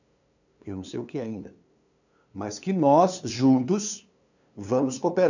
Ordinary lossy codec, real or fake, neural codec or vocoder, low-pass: none; fake; codec, 16 kHz, 2 kbps, FunCodec, trained on LibriTTS, 25 frames a second; 7.2 kHz